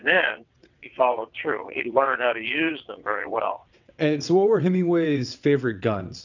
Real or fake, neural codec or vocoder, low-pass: fake; vocoder, 22.05 kHz, 80 mel bands, WaveNeXt; 7.2 kHz